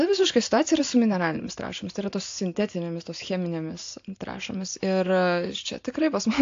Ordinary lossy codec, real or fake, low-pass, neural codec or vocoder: AAC, 48 kbps; real; 7.2 kHz; none